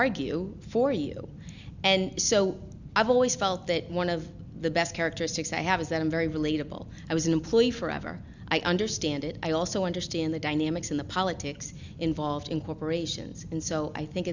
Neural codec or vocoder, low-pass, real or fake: none; 7.2 kHz; real